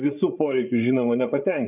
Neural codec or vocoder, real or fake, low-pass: codec, 16 kHz, 16 kbps, FreqCodec, larger model; fake; 3.6 kHz